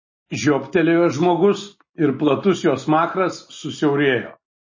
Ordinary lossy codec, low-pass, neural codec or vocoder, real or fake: MP3, 32 kbps; 7.2 kHz; none; real